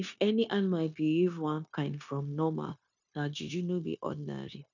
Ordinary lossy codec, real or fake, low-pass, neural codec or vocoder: none; fake; 7.2 kHz; codec, 16 kHz, 0.9 kbps, LongCat-Audio-Codec